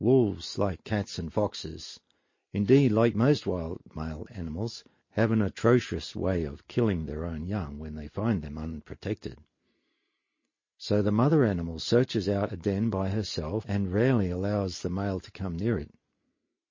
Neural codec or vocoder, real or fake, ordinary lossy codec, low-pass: none; real; MP3, 32 kbps; 7.2 kHz